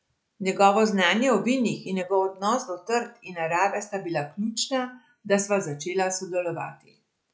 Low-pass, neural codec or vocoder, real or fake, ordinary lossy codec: none; none; real; none